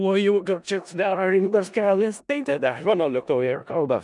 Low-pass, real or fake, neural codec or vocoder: 10.8 kHz; fake; codec, 16 kHz in and 24 kHz out, 0.4 kbps, LongCat-Audio-Codec, four codebook decoder